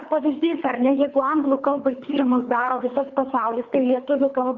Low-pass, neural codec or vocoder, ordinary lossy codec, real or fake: 7.2 kHz; codec, 24 kHz, 3 kbps, HILCodec; Opus, 64 kbps; fake